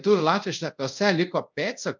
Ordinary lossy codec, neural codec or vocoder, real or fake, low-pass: MP3, 64 kbps; codec, 24 kHz, 0.5 kbps, DualCodec; fake; 7.2 kHz